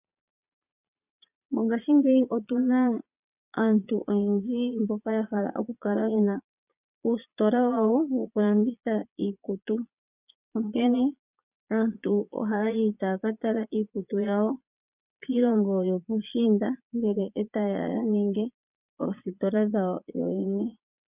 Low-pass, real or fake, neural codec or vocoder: 3.6 kHz; fake; vocoder, 22.05 kHz, 80 mel bands, Vocos